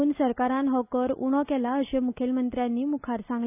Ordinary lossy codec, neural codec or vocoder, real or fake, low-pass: AAC, 32 kbps; none; real; 3.6 kHz